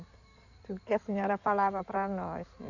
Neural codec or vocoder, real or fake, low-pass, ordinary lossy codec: codec, 16 kHz in and 24 kHz out, 2.2 kbps, FireRedTTS-2 codec; fake; 7.2 kHz; none